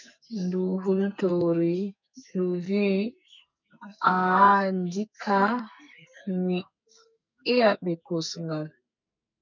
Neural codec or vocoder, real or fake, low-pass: codec, 44.1 kHz, 2.6 kbps, SNAC; fake; 7.2 kHz